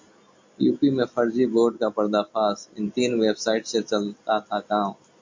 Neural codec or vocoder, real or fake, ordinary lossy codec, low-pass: none; real; MP3, 48 kbps; 7.2 kHz